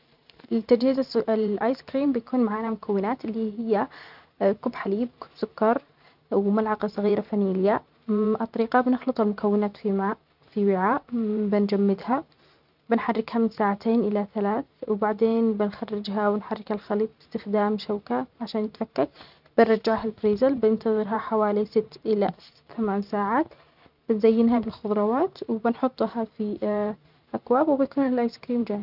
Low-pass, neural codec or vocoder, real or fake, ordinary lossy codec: 5.4 kHz; vocoder, 44.1 kHz, 128 mel bands every 512 samples, BigVGAN v2; fake; none